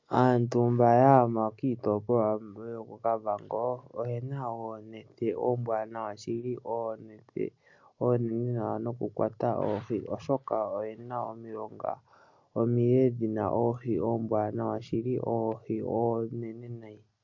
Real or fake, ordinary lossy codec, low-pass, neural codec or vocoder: real; MP3, 48 kbps; 7.2 kHz; none